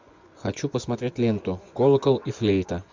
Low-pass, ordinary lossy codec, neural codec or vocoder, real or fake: 7.2 kHz; MP3, 64 kbps; vocoder, 24 kHz, 100 mel bands, Vocos; fake